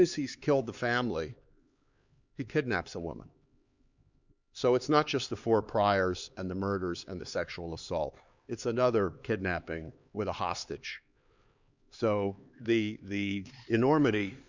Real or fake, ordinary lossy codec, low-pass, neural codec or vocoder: fake; Opus, 64 kbps; 7.2 kHz; codec, 16 kHz, 4 kbps, X-Codec, HuBERT features, trained on LibriSpeech